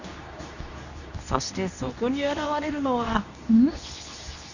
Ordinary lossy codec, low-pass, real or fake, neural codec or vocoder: none; 7.2 kHz; fake; codec, 24 kHz, 0.9 kbps, WavTokenizer, medium speech release version 2